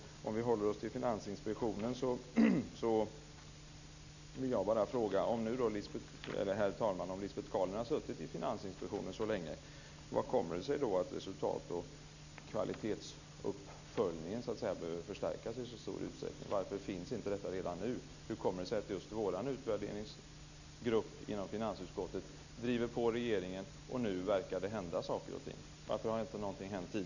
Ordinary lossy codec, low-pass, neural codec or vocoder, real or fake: none; 7.2 kHz; none; real